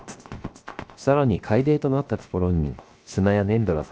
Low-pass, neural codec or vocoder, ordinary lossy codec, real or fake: none; codec, 16 kHz, 0.7 kbps, FocalCodec; none; fake